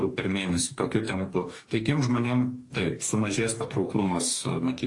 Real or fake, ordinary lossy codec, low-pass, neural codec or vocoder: fake; AAC, 48 kbps; 10.8 kHz; codec, 44.1 kHz, 2.6 kbps, DAC